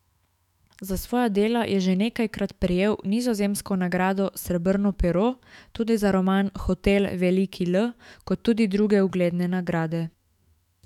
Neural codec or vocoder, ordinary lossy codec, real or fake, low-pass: autoencoder, 48 kHz, 128 numbers a frame, DAC-VAE, trained on Japanese speech; none; fake; 19.8 kHz